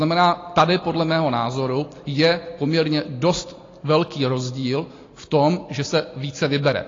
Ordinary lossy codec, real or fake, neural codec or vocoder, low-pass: AAC, 32 kbps; real; none; 7.2 kHz